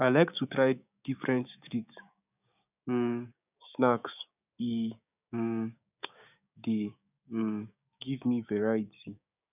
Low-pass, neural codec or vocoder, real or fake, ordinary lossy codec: 3.6 kHz; codec, 16 kHz, 6 kbps, DAC; fake; none